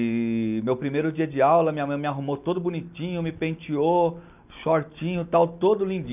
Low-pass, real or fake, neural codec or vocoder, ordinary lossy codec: 3.6 kHz; real; none; none